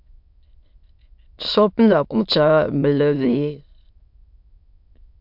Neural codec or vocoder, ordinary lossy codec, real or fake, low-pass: autoencoder, 22.05 kHz, a latent of 192 numbers a frame, VITS, trained on many speakers; MP3, 48 kbps; fake; 5.4 kHz